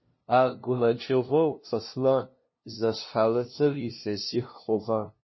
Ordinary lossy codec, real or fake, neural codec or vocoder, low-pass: MP3, 24 kbps; fake; codec, 16 kHz, 0.5 kbps, FunCodec, trained on LibriTTS, 25 frames a second; 7.2 kHz